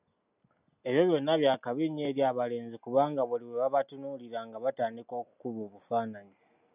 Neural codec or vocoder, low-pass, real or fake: none; 3.6 kHz; real